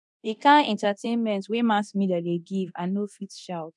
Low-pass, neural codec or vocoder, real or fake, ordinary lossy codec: 10.8 kHz; codec, 24 kHz, 0.9 kbps, DualCodec; fake; none